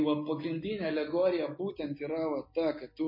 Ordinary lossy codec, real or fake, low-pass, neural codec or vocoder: MP3, 24 kbps; real; 5.4 kHz; none